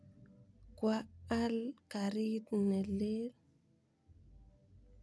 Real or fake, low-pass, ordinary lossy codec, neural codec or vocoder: real; none; none; none